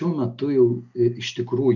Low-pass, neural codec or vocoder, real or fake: 7.2 kHz; none; real